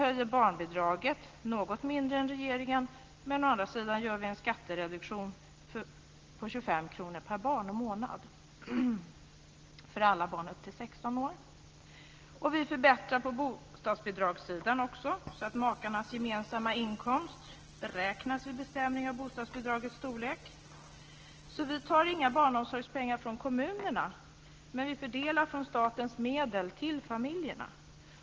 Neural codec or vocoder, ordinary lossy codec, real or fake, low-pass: none; Opus, 16 kbps; real; 7.2 kHz